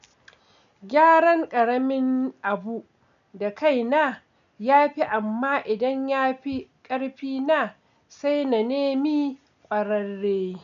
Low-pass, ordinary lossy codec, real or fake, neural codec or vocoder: 7.2 kHz; none; real; none